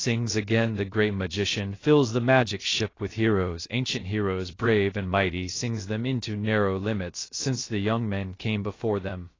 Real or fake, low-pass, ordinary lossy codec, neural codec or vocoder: fake; 7.2 kHz; AAC, 32 kbps; codec, 16 kHz, 0.3 kbps, FocalCodec